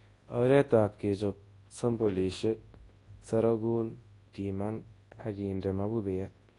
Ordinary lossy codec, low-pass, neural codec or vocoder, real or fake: AAC, 32 kbps; 10.8 kHz; codec, 24 kHz, 0.9 kbps, WavTokenizer, large speech release; fake